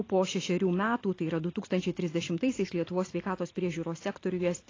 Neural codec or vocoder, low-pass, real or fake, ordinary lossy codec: none; 7.2 kHz; real; AAC, 32 kbps